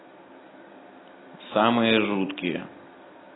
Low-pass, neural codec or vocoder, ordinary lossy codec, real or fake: 7.2 kHz; none; AAC, 16 kbps; real